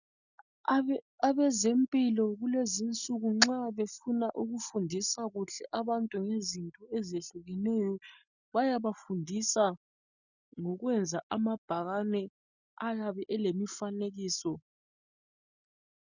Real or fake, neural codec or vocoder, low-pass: real; none; 7.2 kHz